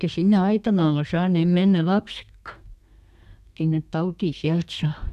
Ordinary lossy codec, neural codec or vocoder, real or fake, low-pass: none; codec, 32 kHz, 1.9 kbps, SNAC; fake; 14.4 kHz